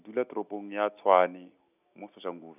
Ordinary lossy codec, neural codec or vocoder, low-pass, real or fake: none; none; 3.6 kHz; real